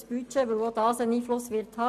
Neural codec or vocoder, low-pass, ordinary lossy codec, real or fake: none; 14.4 kHz; none; real